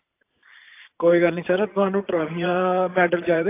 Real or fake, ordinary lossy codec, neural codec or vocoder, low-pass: fake; AAC, 24 kbps; vocoder, 44.1 kHz, 128 mel bands every 512 samples, BigVGAN v2; 3.6 kHz